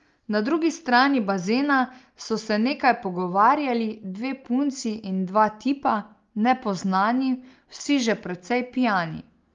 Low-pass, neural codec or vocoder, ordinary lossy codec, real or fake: 7.2 kHz; none; Opus, 32 kbps; real